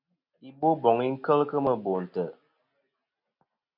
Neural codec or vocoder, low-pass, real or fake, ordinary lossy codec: none; 5.4 kHz; real; MP3, 48 kbps